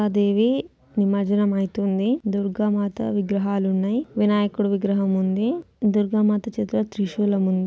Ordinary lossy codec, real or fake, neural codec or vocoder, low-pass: none; real; none; none